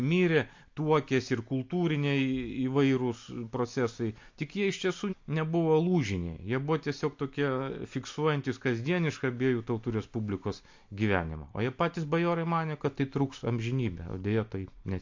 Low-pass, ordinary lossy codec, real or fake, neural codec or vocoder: 7.2 kHz; MP3, 48 kbps; real; none